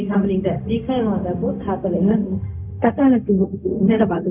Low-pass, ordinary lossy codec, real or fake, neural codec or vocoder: 3.6 kHz; none; fake; codec, 16 kHz, 0.4 kbps, LongCat-Audio-Codec